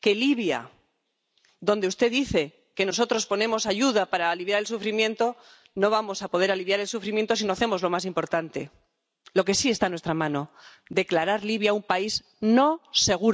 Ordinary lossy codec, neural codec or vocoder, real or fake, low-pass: none; none; real; none